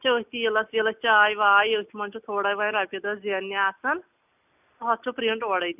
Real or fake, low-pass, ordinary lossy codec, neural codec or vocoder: real; 3.6 kHz; none; none